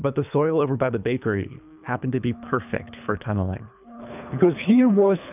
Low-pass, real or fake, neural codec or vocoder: 3.6 kHz; fake; codec, 24 kHz, 3 kbps, HILCodec